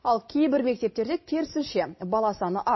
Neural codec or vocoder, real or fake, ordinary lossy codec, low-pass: none; real; MP3, 24 kbps; 7.2 kHz